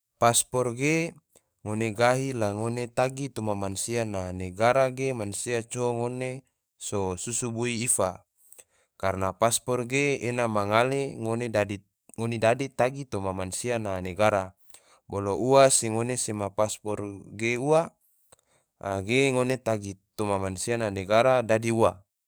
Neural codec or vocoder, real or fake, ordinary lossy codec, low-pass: codec, 44.1 kHz, 7.8 kbps, DAC; fake; none; none